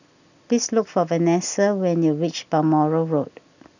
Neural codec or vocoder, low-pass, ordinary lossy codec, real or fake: none; 7.2 kHz; none; real